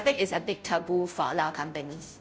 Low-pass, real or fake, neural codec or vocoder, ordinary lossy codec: none; fake; codec, 16 kHz, 0.5 kbps, FunCodec, trained on Chinese and English, 25 frames a second; none